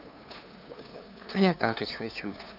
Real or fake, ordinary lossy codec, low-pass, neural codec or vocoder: fake; none; 5.4 kHz; codec, 16 kHz, 2 kbps, FunCodec, trained on LibriTTS, 25 frames a second